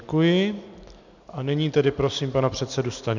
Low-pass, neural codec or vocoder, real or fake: 7.2 kHz; none; real